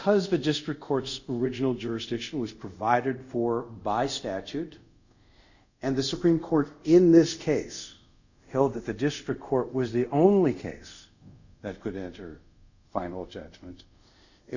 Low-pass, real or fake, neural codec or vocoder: 7.2 kHz; fake; codec, 24 kHz, 0.5 kbps, DualCodec